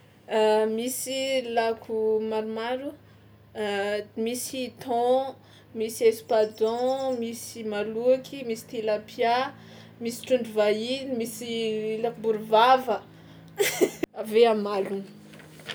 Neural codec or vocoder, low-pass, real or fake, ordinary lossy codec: none; none; real; none